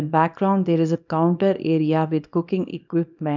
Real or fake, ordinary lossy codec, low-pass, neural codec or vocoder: fake; none; 7.2 kHz; codec, 24 kHz, 0.9 kbps, WavTokenizer, small release